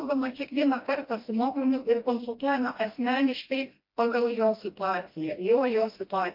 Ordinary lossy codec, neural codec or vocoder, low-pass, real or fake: MP3, 32 kbps; codec, 16 kHz, 1 kbps, FreqCodec, smaller model; 5.4 kHz; fake